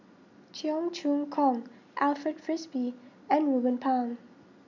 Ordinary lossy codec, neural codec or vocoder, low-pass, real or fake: none; none; 7.2 kHz; real